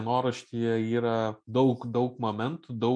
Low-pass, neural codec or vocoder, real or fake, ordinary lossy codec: 9.9 kHz; none; real; MP3, 48 kbps